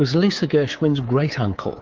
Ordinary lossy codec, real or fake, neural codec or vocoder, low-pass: Opus, 32 kbps; fake; codec, 24 kHz, 3.1 kbps, DualCodec; 7.2 kHz